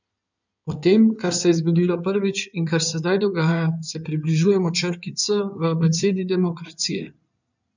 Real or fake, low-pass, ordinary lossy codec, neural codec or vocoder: fake; 7.2 kHz; none; codec, 16 kHz in and 24 kHz out, 2.2 kbps, FireRedTTS-2 codec